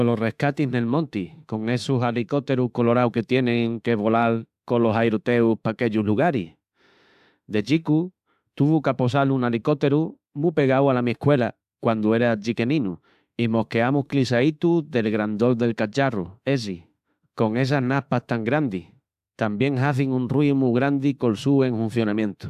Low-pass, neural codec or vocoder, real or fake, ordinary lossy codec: 14.4 kHz; autoencoder, 48 kHz, 32 numbers a frame, DAC-VAE, trained on Japanese speech; fake; AAC, 96 kbps